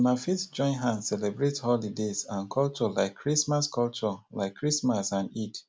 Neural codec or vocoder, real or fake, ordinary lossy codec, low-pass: none; real; none; none